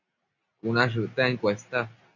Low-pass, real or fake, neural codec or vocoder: 7.2 kHz; real; none